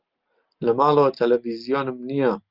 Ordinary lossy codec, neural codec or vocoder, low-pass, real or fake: Opus, 24 kbps; none; 5.4 kHz; real